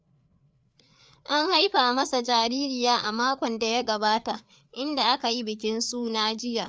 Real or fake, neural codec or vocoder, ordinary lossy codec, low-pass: fake; codec, 16 kHz, 4 kbps, FreqCodec, larger model; none; none